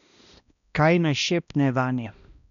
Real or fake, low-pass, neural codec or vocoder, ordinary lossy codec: fake; 7.2 kHz; codec, 16 kHz, 1 kbps, X-Codec, HuBERT features, trained on balanced general audio; none